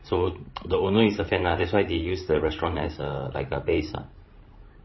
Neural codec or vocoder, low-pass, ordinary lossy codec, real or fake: codec, 16 kHz, 16 kbps, FreqCodec, larger model; 7.2 kHz; MP3, 24 kbps; fake